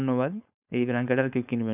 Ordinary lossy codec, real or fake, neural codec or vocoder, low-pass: none; fake; codec, 16 kHz, 4.8 kbps, FACodec; 3.6 kHz